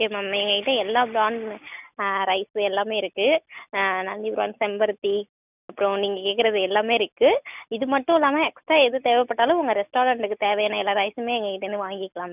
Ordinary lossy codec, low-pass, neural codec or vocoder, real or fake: none; 3.6 kHz; none; real